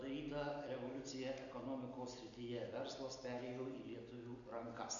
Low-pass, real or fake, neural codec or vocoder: 7.2 kHz; real; none